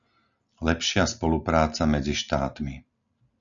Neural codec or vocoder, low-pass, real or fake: none; 7.2 kHz; real